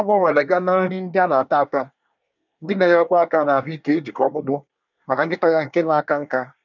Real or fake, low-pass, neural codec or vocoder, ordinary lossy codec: fake; 7.2 kHz; codec, 24 kHz, 1 kbps, SNAC; none